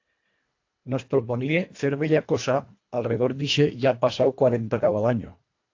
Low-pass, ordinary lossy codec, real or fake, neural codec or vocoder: 7.2 kHz; AAC, 48 kbps; fake; codec, 24 kHz, 1.5 kbps, HILCodec